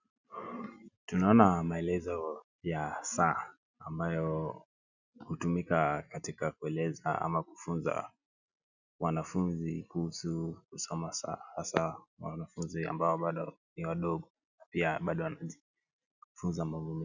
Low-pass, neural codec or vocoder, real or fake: 7.2 kHz; none; real